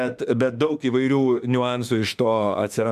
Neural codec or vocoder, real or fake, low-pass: autoencoder, 48 kHz, 32 numbers a frame, DAC-VAE, trained on Japanese speech; fake; 14.4 kHz